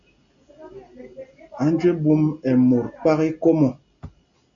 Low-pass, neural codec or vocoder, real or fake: 7.2 kHz; none; real